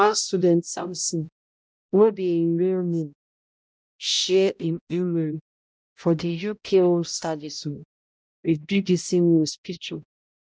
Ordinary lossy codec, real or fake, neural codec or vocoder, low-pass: none; fake; codec, 16 kHz, 0.5 kbps, X-Codec, HuBERT features, trained on balanced general audio; none